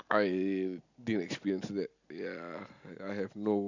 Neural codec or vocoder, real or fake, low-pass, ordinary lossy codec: none; real; 7.2 kHz; none